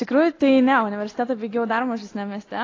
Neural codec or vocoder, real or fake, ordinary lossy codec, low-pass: none; real; AAC, 32 kbps; 7.2 kHz